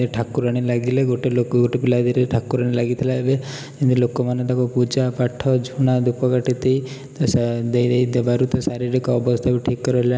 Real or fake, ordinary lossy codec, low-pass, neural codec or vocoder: real; none; none; none